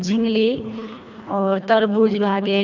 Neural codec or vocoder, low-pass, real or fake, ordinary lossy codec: codec, 24 kHz, 1.5 kbps, HILCodec; 7.2 kHz; fake; none